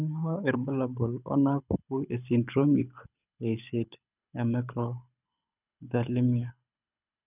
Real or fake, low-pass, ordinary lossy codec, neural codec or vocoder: fake; 3.6 kHz; none; codec, 24 kHz, 6 kbps, HILCodec